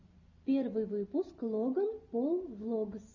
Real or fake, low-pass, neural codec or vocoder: real; 7.2 kHz; none